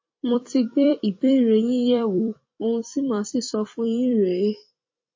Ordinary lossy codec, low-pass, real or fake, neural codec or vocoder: MP3, 32 kbps; 7.2 kHz; fake; vocoder, 44.1 kHz, 128 mel bands every 256 samples, BigVGAN v2